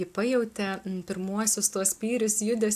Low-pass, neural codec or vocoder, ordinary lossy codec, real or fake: 14.4 kHz; none; AAC, 96 kbps; real